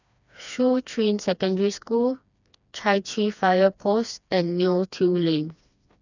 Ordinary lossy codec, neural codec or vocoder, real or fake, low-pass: none; codec, 16 kHz, 2 kbps, FreqCodec, smaller model; fake; 7.2 kHz